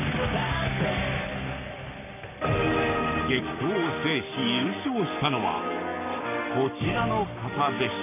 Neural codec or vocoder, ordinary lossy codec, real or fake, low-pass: codec, 44.1 kHz, 7.8 kbps, Pupu-Codec; none; fake; 3.6 kHz